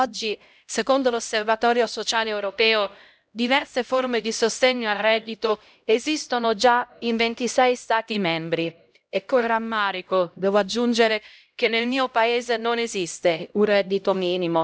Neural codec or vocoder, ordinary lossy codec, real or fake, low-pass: codec, 16 kHz, 0.5 kbps, X-Codec, HuBERT features, trained on LibriSpeech; none; fake; none